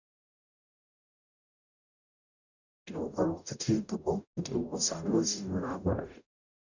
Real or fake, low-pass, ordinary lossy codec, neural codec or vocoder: fake; 7.2 kHz; none; codec, 44.1 kHz, 0.9 kbps, DAC